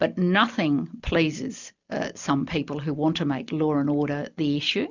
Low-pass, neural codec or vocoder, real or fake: 7.2 kHz; none; real